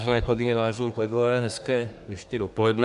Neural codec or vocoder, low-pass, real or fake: codec, 24 kHz, 1 kbps, SNAC; 10.8 kHz; fake